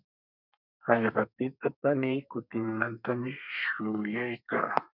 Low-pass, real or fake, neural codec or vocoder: 5.4 kHz; fake; codec, 32 kHz, 1.9 kbps, SNAC